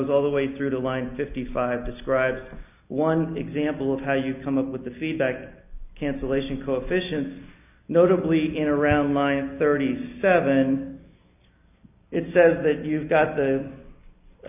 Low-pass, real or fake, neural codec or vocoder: 3.6 kHz; real; none